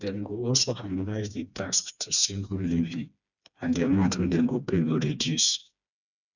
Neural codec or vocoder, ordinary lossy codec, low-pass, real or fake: codec, 16 kHz, 2 kbps, FreqCodec, smaller model; none; 7.2 kHz; fake